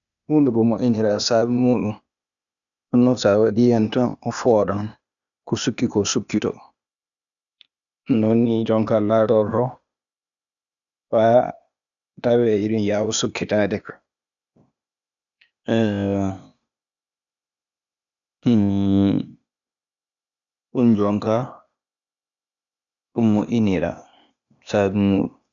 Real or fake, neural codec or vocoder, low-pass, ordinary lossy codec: fake; codec, 16 kHz, 0.8 kbps, ZipCodec; 7.2 kHz; none